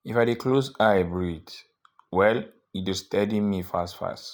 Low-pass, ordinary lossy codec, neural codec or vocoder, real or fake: none; none; none; real